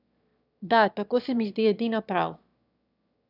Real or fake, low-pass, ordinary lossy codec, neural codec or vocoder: fake; 5.4 kHz; none; autoencoder, 22.05 kHz, a latent of 192 numbers a frame, VITS, trained on one speaker